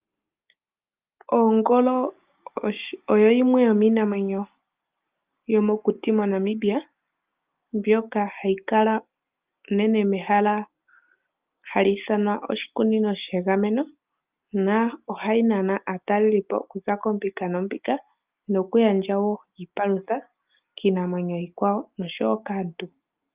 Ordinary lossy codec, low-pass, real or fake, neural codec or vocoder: Opus, 24 kbps; 3.6 kHz; real; none